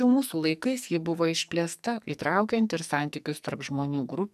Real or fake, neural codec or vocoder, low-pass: fake; codec, 44.1 kHz, 2.6 kbps, SNAC; 14.4 kHz